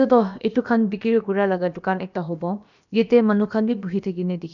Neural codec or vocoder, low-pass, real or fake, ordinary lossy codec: codec, 16 kHz, about 1 kbps, DyCAST, with the encoder's durations; 7.2 kHz; fake; none